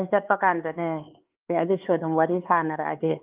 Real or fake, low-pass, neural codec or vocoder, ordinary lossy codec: fake; 3.6 kHz; codec, 16 kHz, 4 kbps, X-Codec, HuBERT features, trained on LibriSpeech; Opus, 32 kbps